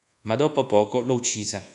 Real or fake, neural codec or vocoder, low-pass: fake; codec, 24 kHz, 1.2 kbps, DualCodec; 10.8 kHz